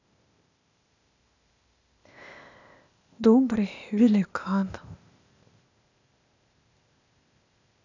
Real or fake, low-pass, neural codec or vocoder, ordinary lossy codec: fake; 7.2 kHz; codec, 16 kHz, 0.8 kbps, ZipCodec; none